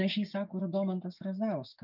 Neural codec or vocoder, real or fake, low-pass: codec, 16 kHz, 6 kbps, DAC; fake; 5.4 kHz